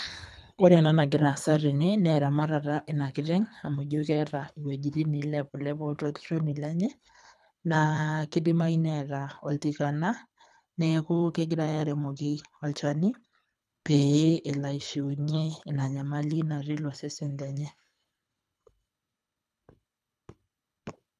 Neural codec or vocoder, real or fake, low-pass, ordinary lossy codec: codec, 24 kHz, 3 kbps, HILCodec; fake; none; none